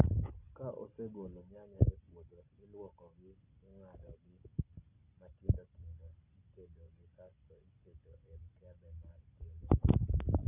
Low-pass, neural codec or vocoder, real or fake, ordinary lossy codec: 3.6 kHz; none; real; none